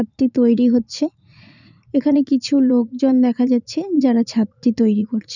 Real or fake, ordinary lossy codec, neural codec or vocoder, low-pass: fake; none; vocoder, 44.1 kHz, 80 mel bands, Vocos; 7.2 kHz